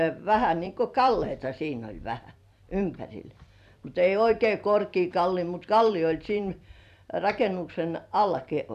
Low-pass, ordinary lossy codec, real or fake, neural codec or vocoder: 14.4 kHz; none; fake; vocoder, 44.1 kHz, 128 mel bands every 256 samples, BigVGAN v2